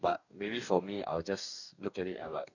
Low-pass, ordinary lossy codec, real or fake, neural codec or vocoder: 7.2 kHz; none; fake; codec, 44.1 kHz, 2.6 kbps, DAC